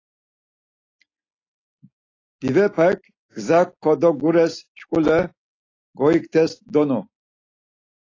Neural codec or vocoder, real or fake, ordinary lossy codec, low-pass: none; real; AAC, 32 kbps; 7.2 kHz